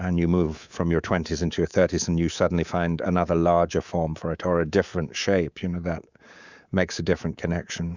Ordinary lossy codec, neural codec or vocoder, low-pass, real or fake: Opus, 64 kbps; codec, 24 kHz, 3.1 kbps, DualCodec; 7.2 kHz; fake